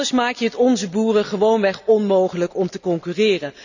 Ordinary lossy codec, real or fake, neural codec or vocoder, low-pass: none; real; none; 7.2 kHz